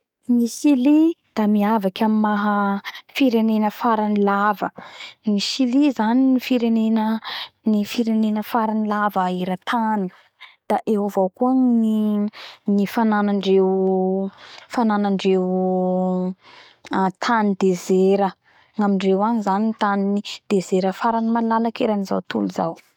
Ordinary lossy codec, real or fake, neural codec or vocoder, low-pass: none; fake; codec, 44.1 kHz, 7.8 kbps, DAC; 19.8 kHz